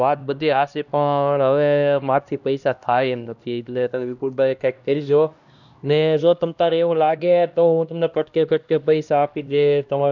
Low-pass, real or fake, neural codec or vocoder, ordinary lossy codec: 7.2 kHz; fake; codec, 16 kHz, 1 kbps, X-Codec, HuBERT features, trained on LibriSpeech; none